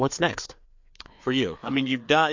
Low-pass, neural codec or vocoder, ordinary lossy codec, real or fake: 7.2 kHz; codec, 16 kHz, 4 kbps, FreqCodec, larger model; MP3, 48 kbps; fake